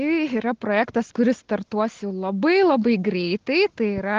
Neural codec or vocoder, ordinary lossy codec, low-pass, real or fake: none; Opus, 16 kbps; 7.2 kHz; real